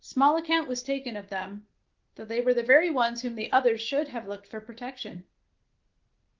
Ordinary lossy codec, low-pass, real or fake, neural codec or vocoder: Opus, 16 kbps; 7.2 kHz; real; none